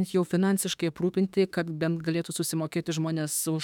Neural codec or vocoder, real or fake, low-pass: autoencoder, 48 kHz, 32 numbers a frame, DAC-VAE, trained on Japanese speech; fake; 19.8 kHz